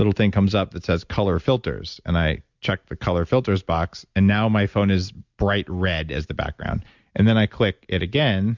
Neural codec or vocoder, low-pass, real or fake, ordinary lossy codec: none; 7.2 kHz; real; Opus, 64 kbps